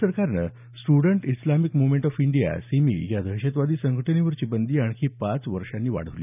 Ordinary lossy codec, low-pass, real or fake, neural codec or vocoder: none; 3.6 kHz; real; none